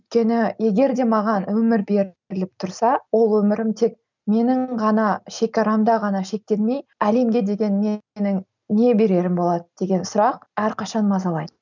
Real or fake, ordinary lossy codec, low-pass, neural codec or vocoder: real; none; 7.2 kHz; none